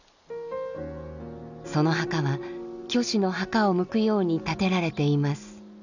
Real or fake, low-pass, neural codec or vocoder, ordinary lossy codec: real; 7.2 kHz; none; none